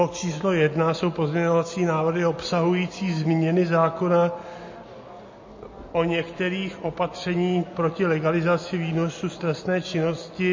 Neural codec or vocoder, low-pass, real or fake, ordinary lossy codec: none; 7.2 kHz; real; MP3, 32 kbps